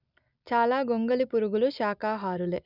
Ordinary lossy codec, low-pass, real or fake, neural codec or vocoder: none; 5.4 kHz; real; none